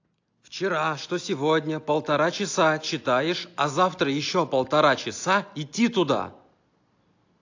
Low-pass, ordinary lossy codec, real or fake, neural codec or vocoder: 7.2 kHz; AAC, 48 kbps; real; none